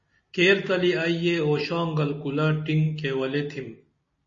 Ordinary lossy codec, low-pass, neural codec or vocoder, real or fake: MP3, 32 kbps; 7.2 kHz; none; real